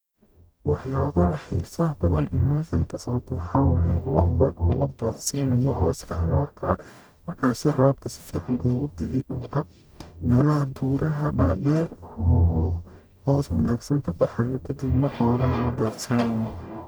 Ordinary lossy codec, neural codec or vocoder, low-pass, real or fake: none; codec, 44.1 kHz, 0.9 kbps, DAC; none; fake